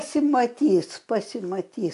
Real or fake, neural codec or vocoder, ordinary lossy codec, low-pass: real; none; AAC, 64 kbps; 10.8 kHz